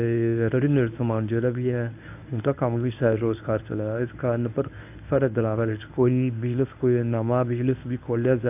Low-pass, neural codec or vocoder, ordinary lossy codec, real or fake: 3.6 kHz; codec, 24 kHz, 0.9 kbps, WavTokenizer, medium speech release version 1; AAC, 32 kbps; fake